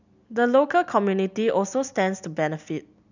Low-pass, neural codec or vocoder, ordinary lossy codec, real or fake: 7.2 kHz; none; none; real